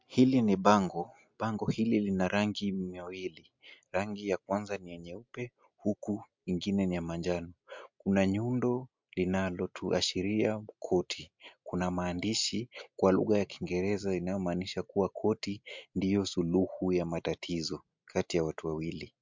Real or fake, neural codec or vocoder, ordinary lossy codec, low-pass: real; none; MP3, 64 kbps; 7.2 kHz